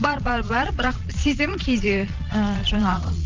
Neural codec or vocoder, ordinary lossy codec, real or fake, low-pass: vocoder, 22.05 kHz, 80 mel bands, Vocos; Opus, 32 kbps; fake; 7.2 kHz